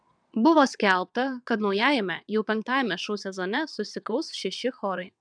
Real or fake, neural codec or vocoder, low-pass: fake; vocoder, 22.05 kHz, 80 mel bands, WaveNeXt; 9.9 kHz